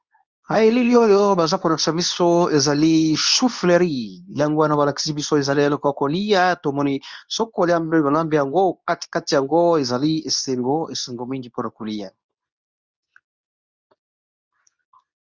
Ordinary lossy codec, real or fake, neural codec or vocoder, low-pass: Opus, 64 kbps; fake; codec, 24 kHz, 0.9 kbps, WavTokenizer, medium speech release version 2; 7.2 kHz